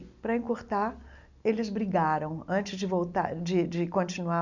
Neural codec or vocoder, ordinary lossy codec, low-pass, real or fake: none; none; 7.2 kHz; real